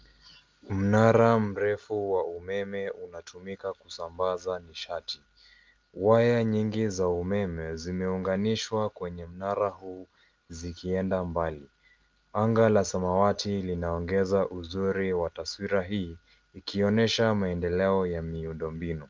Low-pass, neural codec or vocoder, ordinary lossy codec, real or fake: 7.2 kHz; none; Opus, 32 kbps; real